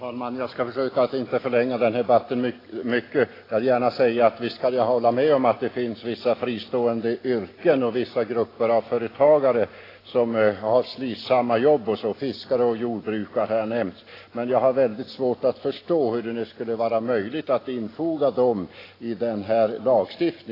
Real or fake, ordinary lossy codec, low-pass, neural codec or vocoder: real; AAC, 24 kbps; 5.4 kHz; none